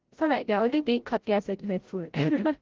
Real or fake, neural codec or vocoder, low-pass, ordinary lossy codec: fake; codec, 16 kHz, 0.5 kbps, FreqCodec, larger model; 7.2 kHz; Opus, 16 kbps